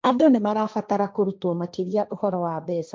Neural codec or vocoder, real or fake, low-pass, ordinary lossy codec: codec, 16 kHz, 1.1 kbps, Voila-Tokenizer; fake; none; none